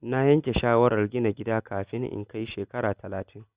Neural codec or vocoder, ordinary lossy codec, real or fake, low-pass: none; Opus, 64 kbps; real; 3.6 kHz